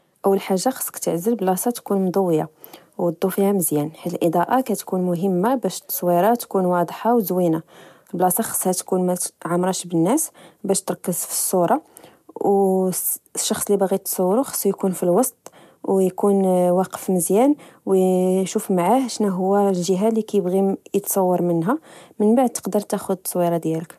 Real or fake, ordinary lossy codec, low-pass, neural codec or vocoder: real; MP3, 96 kbps; 14.4 kHz; none